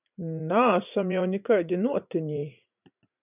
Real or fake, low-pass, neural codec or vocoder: fake; 3.6 kHz; vocoder, 44.1 kHz, 80 mel bands, Vocos